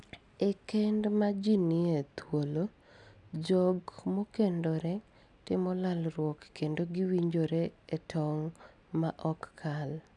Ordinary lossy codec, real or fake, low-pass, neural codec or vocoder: none; real; 10.8 kHz; none